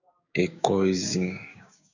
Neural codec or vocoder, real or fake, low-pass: codec, 16 kHz, 6 kbps, DAC; fake; 7.2 kHz